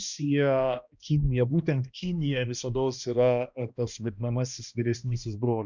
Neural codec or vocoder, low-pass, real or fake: codec, 16 kHz, 1 kbps, X-Codec, HuBERT features, trained on balanced general audio; 7.2 kHz; fake